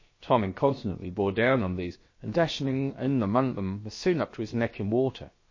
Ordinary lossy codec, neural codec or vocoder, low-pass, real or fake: MP3, 32 kbps; codec, 16 kHz, about 1 kbps, DyCAST, with the encoder's durations; 7.2 kHz; fake